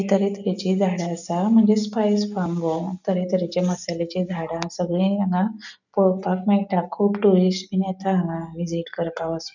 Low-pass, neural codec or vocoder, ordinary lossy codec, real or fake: 7.2 kHz; none; none; real